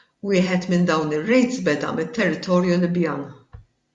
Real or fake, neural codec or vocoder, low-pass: fake; vocoder, 44.1 kHz, 128 mel bands every 256 samples, BigVGAN v2; 10.8 kHz